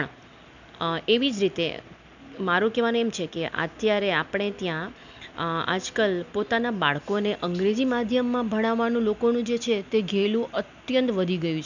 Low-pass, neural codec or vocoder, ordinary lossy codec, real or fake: 7.2 kHz; none; none; real